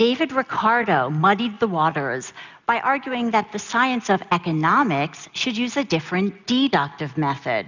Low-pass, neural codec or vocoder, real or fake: 7.2 kHz; none; real